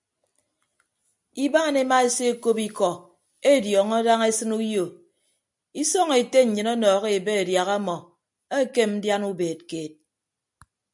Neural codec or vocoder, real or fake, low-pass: none; real; 10.8 kHz